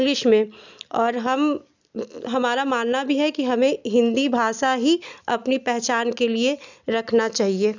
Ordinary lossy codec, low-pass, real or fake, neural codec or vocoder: none; 7.2 kHz; real; none